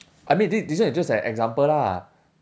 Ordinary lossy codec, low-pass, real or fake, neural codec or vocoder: none; none; real; none